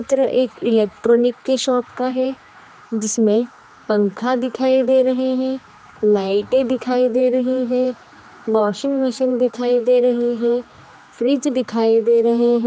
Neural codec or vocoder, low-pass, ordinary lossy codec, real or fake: codec, 16 kHz, 2 kbps, X-Codec, HuBERT features, trained on general audio; none; none; fake